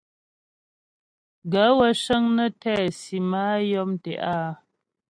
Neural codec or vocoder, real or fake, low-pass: none; real; 9.9 kHz